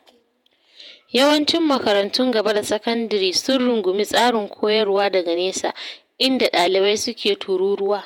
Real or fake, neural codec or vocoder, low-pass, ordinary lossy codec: fake; vocoder, 48 kHz, 128 mel bands, Vocos; 19.8 kHz; MP3, 96 kbps